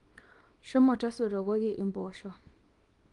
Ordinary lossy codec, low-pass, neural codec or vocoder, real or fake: Opus, 24 kbps; 10.8 kHz; codec, 24 kHz, 0.9 kbps, WavTokenizer, small release; fake